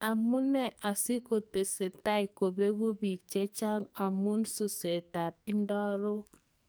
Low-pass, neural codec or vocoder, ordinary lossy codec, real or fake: none; codec, 44.1 kHz, 2.6 kbps, SNAC; none; fake